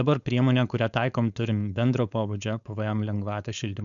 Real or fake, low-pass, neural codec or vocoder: fake; 7.2 kHz; codec, 16 kHz, 4.8 kbps, FACodec